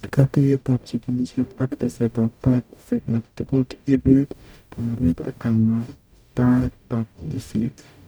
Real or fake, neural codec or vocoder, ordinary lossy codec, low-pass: fake; codec, 44.1 kHz, 0.9 kbps, DAC; none; none